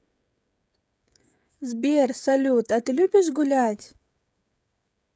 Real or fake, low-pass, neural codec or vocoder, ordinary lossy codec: fake; none; codec, 16 kHz, 16 kbps, FreqCodec, smaller model; none